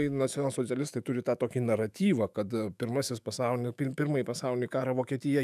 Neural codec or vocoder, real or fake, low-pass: autoencoder, 48 kHz, 128 numbers a frame, DAC-VAE, trained on Japanese speech; fake; 14.4 kHz